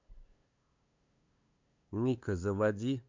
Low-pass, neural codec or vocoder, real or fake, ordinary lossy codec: 7.2 kHz; codec, 16 kHz, 2 kbps, FunCodec, trained on LibriTTS, 25 frames a second; fake; MP3, 64 kbps